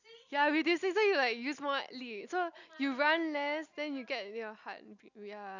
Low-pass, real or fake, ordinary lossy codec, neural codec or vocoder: 7.2 kHz; real; none; none